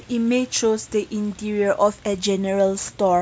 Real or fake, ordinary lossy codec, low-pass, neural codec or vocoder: real; none; none; none